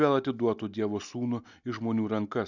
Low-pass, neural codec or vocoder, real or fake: 7.2 kHz; none; real